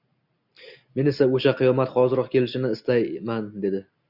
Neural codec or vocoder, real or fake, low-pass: none; real; 5.4 kHz